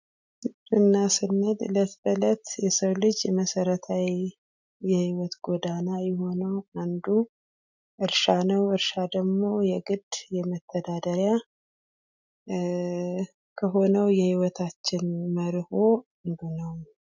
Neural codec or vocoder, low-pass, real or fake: none; 7.2 kHz; real